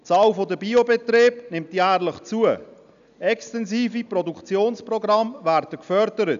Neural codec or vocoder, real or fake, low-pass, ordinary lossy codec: none; real; 7.2 kHz; none